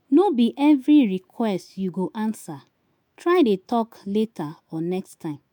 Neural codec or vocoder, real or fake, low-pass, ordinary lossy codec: autoencoder, 48 kHz, 128 numbers a frame, DAC-VAE, trained on Japanese speech; fake; 19.8 kHz; MP3, 96 kbps